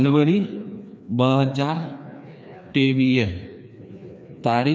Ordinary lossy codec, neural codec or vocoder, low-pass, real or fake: none; codec, 16 kHz, 2 kbps, FreqCodec, larger model; none; fake